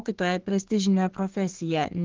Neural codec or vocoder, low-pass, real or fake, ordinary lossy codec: codec, 32 kHz, 1.9 kbps, SNAC; 7.2 kHz; fake; Opus, 16 kbps